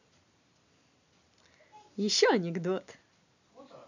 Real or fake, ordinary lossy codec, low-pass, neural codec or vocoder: real; none; 7.2 kHz; none